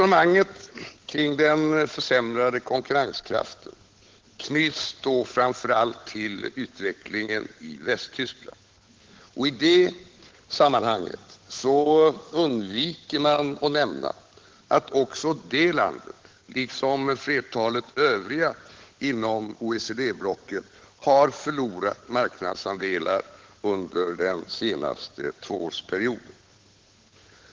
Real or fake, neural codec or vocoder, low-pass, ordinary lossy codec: fake; codec, 16 kHz, 8 kbps, FunCodec, trained on Chinese and English, 25 frames a second; 7.2 kHz; Opus, 16 kbps